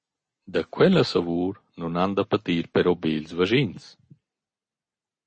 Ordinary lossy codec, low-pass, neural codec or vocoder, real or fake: MP3, 32 kbps; 9.9 kHz; none; real